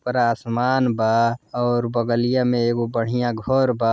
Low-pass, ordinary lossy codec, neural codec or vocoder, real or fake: none; none; none; real